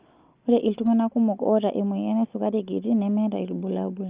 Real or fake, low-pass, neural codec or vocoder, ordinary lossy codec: real; 3.6 kHz; none; Opus, 32 kbps